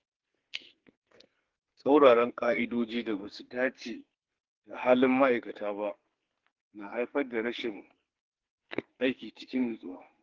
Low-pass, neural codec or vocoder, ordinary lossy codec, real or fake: 7.2 kHz; codec, 44.1 kHz, 2.6 kbps, SNAC; Opus, 16 kbps; fake